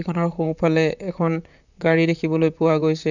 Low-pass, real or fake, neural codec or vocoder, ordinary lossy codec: 7.2 kHz; fake; vocoder, 44.1 kHz, 128 mel bands, Pupu-Vocoder; none